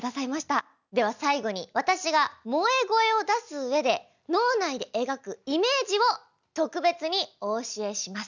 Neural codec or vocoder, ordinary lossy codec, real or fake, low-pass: none; none; real; 7.2 kHz